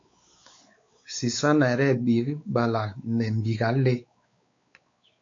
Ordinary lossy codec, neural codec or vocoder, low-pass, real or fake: AAC, 48 kbps; codec, 16 kHz, 4 kbps, X-Codec, WavLM features, trained on Multilingual LibriSpeech; 7.2 kHz; fake